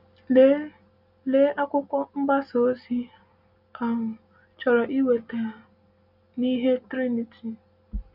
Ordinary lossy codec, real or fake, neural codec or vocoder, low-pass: none; real; none; 5.4 kHz